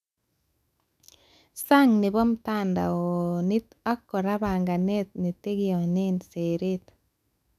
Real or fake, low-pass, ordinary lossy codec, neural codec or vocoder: fake; 14.4 kHz; none; autoencoder, 48 kHz, 128 numbers a frame, DAC-VAE, trained on Japanese speech